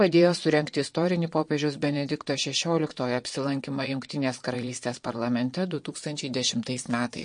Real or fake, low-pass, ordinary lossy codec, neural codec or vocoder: fake; 9.9 kHz; MP3, 48 kbps; vocoder, 22.05 kHz, 80 mel bands, Vocos